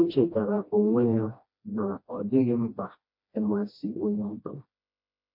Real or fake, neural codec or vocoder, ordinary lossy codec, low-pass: fake; codec, 16 kHz, 1 kbps, FreqCodec, smaller model; MP3, 32 kbps; 5.4 kHz